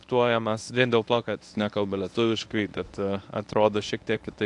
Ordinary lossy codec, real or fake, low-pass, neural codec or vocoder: AAC, 64 kbps; fake; 10.8 kHz; codec, 24 kHz, 0.9 kbps, WavTokenizer, medium speech release version 1